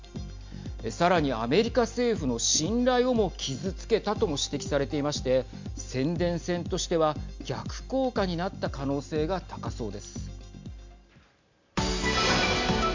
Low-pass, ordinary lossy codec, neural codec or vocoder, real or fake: 7.2 kHz; MP3, 64 kbps; none; real